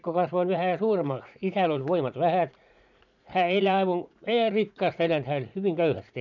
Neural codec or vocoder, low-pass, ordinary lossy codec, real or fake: vocoder, 44.1 kHz, 80 mel bands, Vocos; 7.2 kHz; none; fake